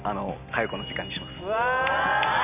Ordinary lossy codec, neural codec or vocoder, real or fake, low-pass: none; none; real; 3.6 kHz